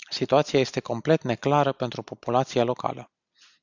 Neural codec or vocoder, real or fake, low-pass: none; real; 7.2 kHz